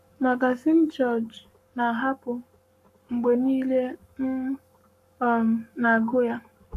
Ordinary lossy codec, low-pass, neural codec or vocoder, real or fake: none; 14.4 kHz; codec, 44.1 kHz, 7.8 kbps, Pupu-Codec; fake